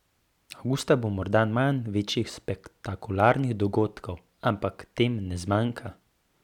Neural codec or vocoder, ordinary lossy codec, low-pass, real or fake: none; none; 19.8 kHz; real